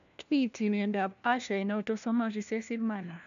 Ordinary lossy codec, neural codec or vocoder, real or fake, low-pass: none; codec, 16 kHz, 1 kbps, FunCodec, trained on LibriTTS, 50 frames a second; fake; 7.2 kHz